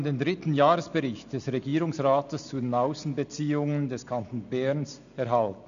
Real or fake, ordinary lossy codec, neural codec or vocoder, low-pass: real; none; none; 7.2 kHz